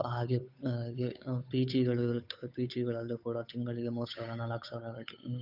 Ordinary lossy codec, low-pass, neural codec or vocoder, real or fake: none; 5.4 kHz; codec, 16 kHz, 8 kbps, FunCodec, trained on Chinese and English, 25 frames a second; fake